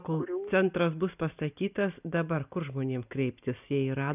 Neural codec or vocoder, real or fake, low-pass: none; real; 3.6 kHz